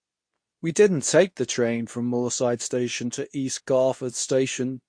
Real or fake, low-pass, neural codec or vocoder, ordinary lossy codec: fake; 9.9 kHz; codec, 24 kHz, 0.9 kbps, WavTokenizer, medium speech release version 2; MP3, 48 kbps